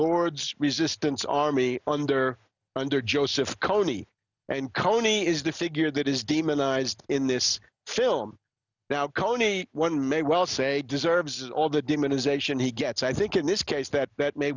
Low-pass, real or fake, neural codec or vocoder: 7.2 kHz; real; none